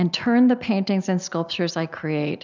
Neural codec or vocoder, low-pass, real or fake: none; 7.2 kHz; real